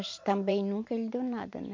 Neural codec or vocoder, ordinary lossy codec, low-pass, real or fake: vocoder, 44.1 kHz, 128 mel bands every 512 samples, BigVGAN v2; MP3, 48 kbps; 7.2 kHz; fake